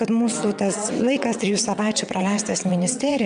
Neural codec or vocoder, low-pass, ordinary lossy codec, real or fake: vocoder, 22.05 kHz, 80 mel bands, WaveNeXt; 9.9 kHz; MP3, 96 kbps; fake